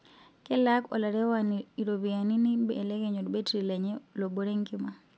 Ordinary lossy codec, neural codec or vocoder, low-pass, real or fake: none; none; none; real